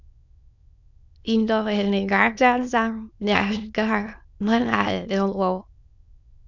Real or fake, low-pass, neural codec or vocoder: fake; 7.2 kHz; autoencoder, 22.05 kHz, a latent of 192 numbers a frame, VITS, trained on many speakers